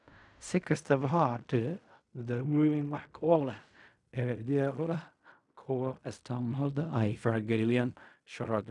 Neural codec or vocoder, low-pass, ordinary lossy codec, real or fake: codec, 16 kHz in and 24 kHz out, 0.4 kbps, LongCat-Audio-Codec, fine tuned four codebook decoder; 10.8 kHz; none; fake